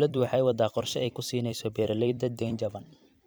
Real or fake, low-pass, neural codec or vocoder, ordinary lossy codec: fake; none; vocoder, 44.1 kHz, 128 mel bands every 256 samples, BigVGAN v2; none